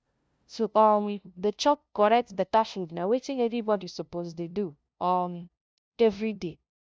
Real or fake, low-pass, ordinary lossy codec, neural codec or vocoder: fake; none; none; codec, 16 kHz, 0.5 kbps, FunCodec, trained on LibriTTS, 25 frames a second